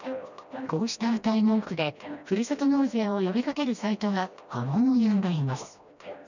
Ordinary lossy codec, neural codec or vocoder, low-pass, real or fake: none; codec, 16 kHz, 1 kbps, FreqCodec, smaller model; 7.2 kHz; fake